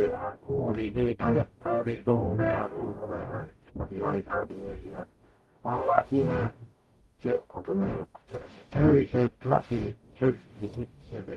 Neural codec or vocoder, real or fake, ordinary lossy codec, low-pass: codec, 44.1 kHz, 0.9 kbps, DAC; fake; Opus, 24 kbps; 19.8 kHz